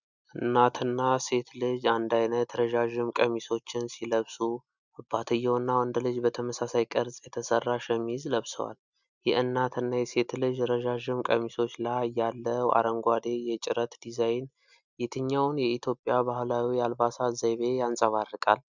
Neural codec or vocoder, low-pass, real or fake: none; 7.2 kHz; real